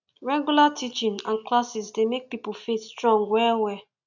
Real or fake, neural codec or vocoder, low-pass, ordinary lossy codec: real; none; 7.2 kHz; none